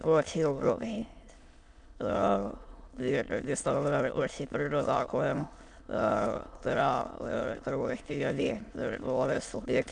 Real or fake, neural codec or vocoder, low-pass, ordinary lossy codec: fake; autoencoder, 22.05 kHz, a latent of 192 numbers a frame, VITS, trained on many speakers; 9.9 kHz; AAC, 64 kbps